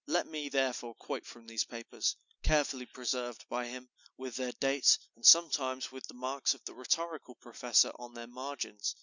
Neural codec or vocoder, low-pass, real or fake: none; 7.2 kHz; real